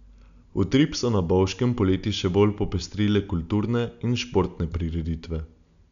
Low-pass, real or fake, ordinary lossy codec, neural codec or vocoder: 7.2 kHz; real; none; none